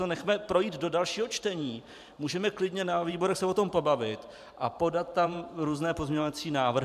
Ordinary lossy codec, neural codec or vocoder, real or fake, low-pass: MP3, 96 kbps; vocoder, 44.1 kHz, 128 mel bands every 512 samples, BigVGAN v2; fake; 14.4 kHz